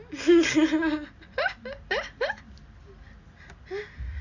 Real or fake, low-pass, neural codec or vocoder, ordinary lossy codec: real; 7.2 kHz; none; Opus, 64 kbps